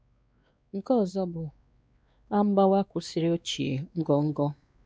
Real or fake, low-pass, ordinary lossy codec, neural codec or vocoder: fake; none; none; codec, 16 kHz, 2 kbps, X-Codec, WavLM features, trained on Multilingual LibriSpeech